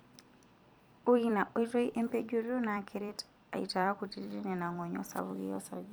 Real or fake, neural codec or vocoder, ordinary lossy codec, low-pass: fake; vocoder, 44.1 kHz, 128 mel bands every 256 samples, BigVGAN v2; none; none